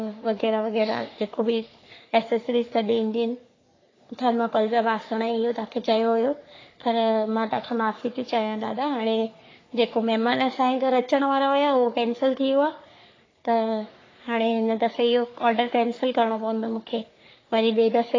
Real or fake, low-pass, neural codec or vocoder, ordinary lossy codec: fake; 7.2 kHz; codec, 44.1 kHz, 3.4 kbps, Pupu-Codec; AAC, 32 kbps